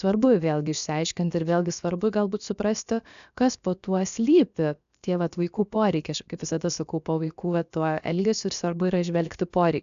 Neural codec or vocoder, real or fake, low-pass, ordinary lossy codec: codec, 16 kHz, about 1 kbps, DyCAST, with the encoder's durations; fake; 7.2 kHz; AAC, 96 kbps